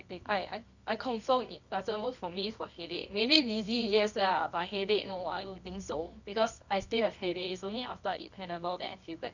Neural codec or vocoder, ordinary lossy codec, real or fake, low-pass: codec, 24 kHz, 0.9 kbps, WavTokenizer, medium music audio release; none; fake; 7.2 kHz